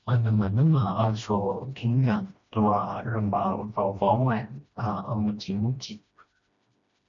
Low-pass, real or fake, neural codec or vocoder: 7.2 kHz; fake; codec, 16 kHz, 1 kbps, FreqCodec, smaller model